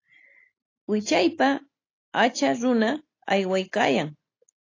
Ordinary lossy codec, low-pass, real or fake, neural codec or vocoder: AAC, 32 kbps; 7.2 kHz; real; none